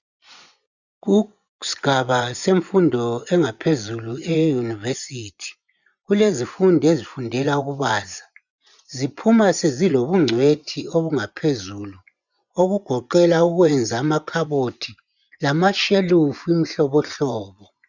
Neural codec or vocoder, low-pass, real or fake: vocoder, 24 kHz, 100 mel bands, Vocos; 7.2 kHz; fake